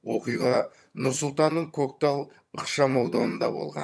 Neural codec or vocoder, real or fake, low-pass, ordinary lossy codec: vocoder, 22.05 kHz, 80 mel bands, HiFi-GAN; fake; none; none